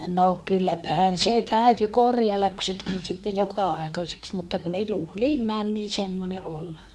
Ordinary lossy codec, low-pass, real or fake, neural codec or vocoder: none; none; fake; codec, 24 kHz, 1 kbps, SNAC